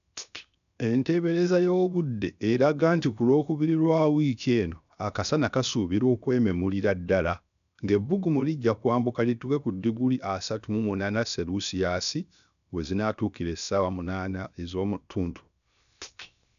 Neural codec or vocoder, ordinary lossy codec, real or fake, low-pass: codec, 16 kHz, 0.7 kbps, FocalCodec; none; fake; 7.2 kHz